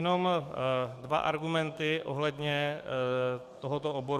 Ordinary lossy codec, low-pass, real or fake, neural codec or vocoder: Opus, 64 kbps; 14.4 kHz; fake; codec, 44.1 kHz, 7.8 kbps, DAC